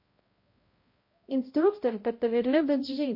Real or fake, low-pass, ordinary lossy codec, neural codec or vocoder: fake; 5.4 kHz; MP3, 32 kbps; codec, 16 kHz, 0.5 kbps, X-Codec, HuBERT features, trained on balanced general audio